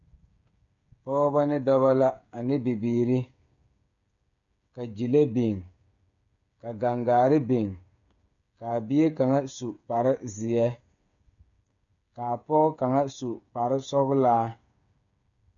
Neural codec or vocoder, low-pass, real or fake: codec, 16 kHz, 8 kbps, FreqCodec, smaller model; 7.2 kHz; fake